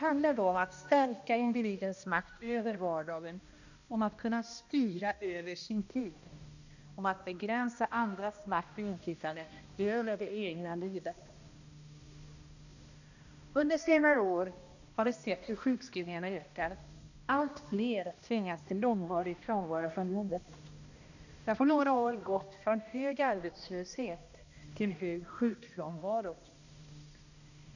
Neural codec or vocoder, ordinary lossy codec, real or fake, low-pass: codec, 16 kHz, 1 kbps, X-Codec, HuBERT features, trained on balanced general audio; none; fake; 7.2 kHz